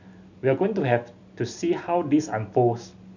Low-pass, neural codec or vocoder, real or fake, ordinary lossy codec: 7.2 kHz; none; real; none